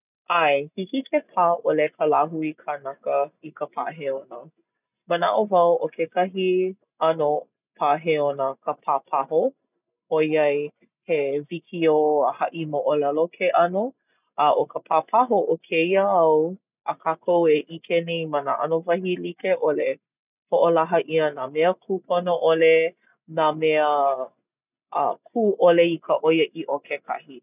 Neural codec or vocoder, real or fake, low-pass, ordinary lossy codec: none; real; 3.6 kHz; none